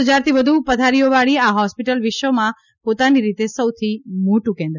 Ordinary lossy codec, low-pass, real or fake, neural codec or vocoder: none; 7.2 kHz; real; none